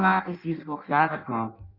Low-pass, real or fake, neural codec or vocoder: 5.4 kHz; fake; codec, 16 kHz in and 24 kHz out, 0.6 kbps, FireRedTTS-2 codec